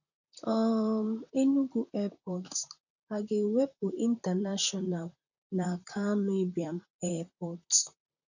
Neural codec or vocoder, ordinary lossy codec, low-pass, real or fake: vocoder, 44.1 kHz, 128 mel bands, Pupu-Vocoder; none; 7.2 kHz; fake